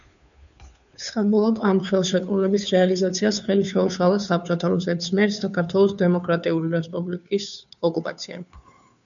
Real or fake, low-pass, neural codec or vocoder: fake; 7.2 kHz; codec, 16 kHz, 2 kbps, FunCodec, trained on Chinese and English, 25 frames a second